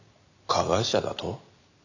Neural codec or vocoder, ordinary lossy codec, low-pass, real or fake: none; none; 7.2 kHz; real